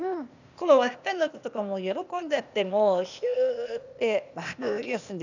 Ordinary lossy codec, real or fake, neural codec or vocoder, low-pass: none; fake; codec, 16 kHz, 0.8 kbps, ZipCodec; 7.2 kHz